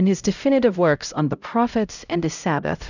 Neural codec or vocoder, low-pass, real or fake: codec, 16 kHz, 0.5 kbps, X-Codec, HuBERT features, trained on LibriSpeech; 7.2 kHz; fake